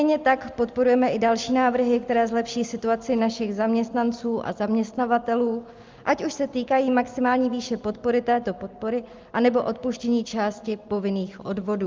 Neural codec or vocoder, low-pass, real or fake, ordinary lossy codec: none; 7.2 kHz; real; Opus, 32 kbps